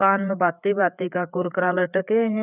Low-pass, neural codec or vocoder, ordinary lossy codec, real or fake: 3.6 kHz; codec, 16 kHz, 4 kbps, FreqCodec, larger model; none; fake